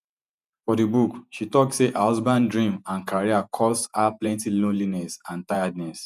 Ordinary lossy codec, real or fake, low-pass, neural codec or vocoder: none; fake; 14.4 kHz; vocoder, 48 kHz, 128 mel bands, Vocos